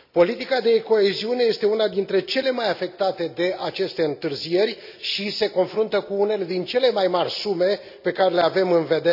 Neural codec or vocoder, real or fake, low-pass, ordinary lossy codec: none; real; 5.4 kHz; none